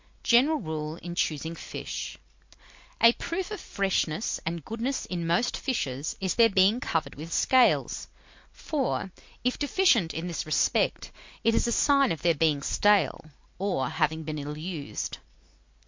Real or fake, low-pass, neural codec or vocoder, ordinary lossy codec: real; 7.2 kHz; none; MP3, 48 kbps